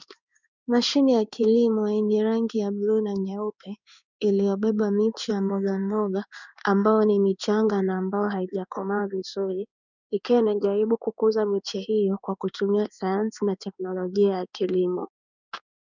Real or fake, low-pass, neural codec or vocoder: fake; 7.2 kHz; codec, 16 kHz in and 24 kHz out, 1 kbps, XY-Tokenizer